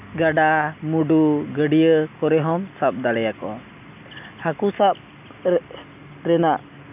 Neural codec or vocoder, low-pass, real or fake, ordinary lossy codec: none; 3.6 kHz; real; none